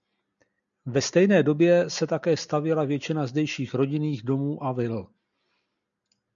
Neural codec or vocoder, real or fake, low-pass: none; real; 7.2 kHz